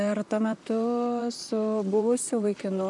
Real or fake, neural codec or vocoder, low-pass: fake; vocoder, 44.1 kHz, 128 mel bands, Pupu-Vocoder; 10.8 kHz